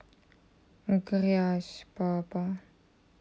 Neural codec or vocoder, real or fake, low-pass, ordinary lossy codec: none; real; none; none